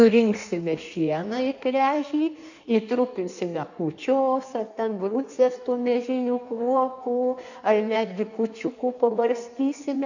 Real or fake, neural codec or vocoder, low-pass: fake; codec, 16 kHz in and 24 kHz out, 1.1 kbps, FireRedTTS-2 codec; 7.2 kHz